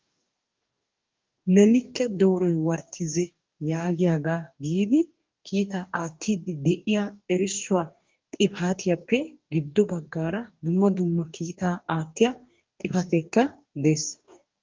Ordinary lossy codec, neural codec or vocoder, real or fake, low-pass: Opus, 32 kbps; codec, 44.1 kHz, 2.6 kbps, DAC; fake; 7.2 kHz